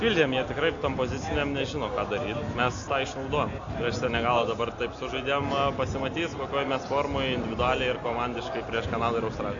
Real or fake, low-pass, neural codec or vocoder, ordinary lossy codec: real; 7.2 kHz; none; AAC, 48 kbps